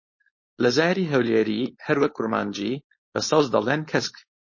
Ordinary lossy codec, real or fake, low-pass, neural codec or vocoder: MP3, 32 kbps; fake; 7.2 kHz; codec, 16 kHz, 4.8 kbps, FACodec